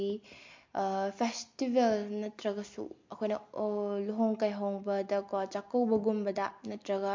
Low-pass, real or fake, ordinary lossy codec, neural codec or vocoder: 7.2 kHz; real; MP3, 48 kbps; none